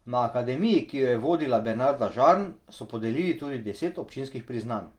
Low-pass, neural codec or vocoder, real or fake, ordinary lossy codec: 19.8 kHz; none; real; Opus, 24 kbps